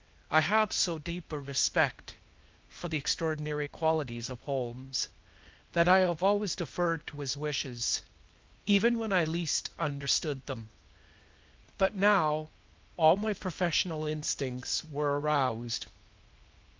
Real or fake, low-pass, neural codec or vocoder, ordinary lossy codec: fake; 7.2 kHz; codec, 16 kHz, 0.8 kbps, ZipCodec; Opus, 24 kbps